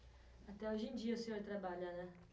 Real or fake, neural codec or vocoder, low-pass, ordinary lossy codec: real; none; none; none